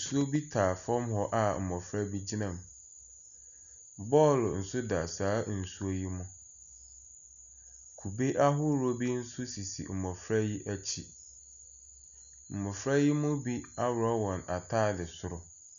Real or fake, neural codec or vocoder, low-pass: real; none; 7.2 kHz